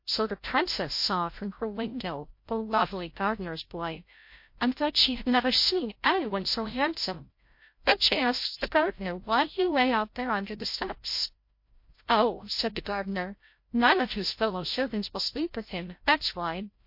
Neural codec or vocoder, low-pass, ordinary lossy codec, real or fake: codec, 16 kHz, 0.5 kbps, FreqCodec, larger model; 5.4 kHz; MP3, 32 kbps; fake